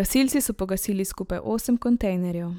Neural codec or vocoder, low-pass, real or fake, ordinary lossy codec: vocoder, 44.1 kHz, 128 mel bands every 512 samples, BigVGAN v2; none; fake; none